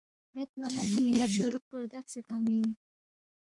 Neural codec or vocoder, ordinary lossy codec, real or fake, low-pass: codec, 24 kHz, 1 kbps, SNAC; MP3, 64 kbps; fake; 10.8 kHz